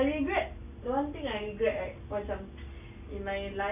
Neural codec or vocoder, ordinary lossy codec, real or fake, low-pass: none; none; real; 3.6 kHz